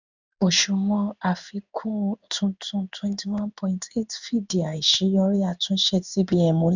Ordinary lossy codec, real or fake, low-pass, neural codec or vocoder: none; fake; 7.2 kHz; codec, 16 kHz in and 24 kHz out, 1 kbps, XY-Tokenizer